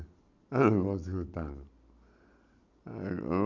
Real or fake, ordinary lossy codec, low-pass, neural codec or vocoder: real; none; 7.2 kHz; none